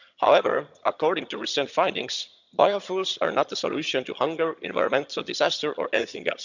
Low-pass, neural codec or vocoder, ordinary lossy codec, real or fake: 7.2 kHz; vocoder, 22.05 kHz, 80 mel bands, HiFi-GAN; none; fake